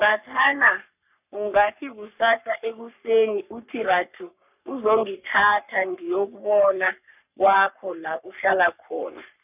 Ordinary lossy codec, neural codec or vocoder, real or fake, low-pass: none; codec, 44.1 kHz, 3.4 kbps, Pupu-Codec; fake; 3.6 kHz